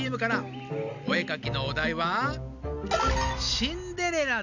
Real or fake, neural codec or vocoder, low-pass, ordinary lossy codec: real; none; 7.2 kHz; none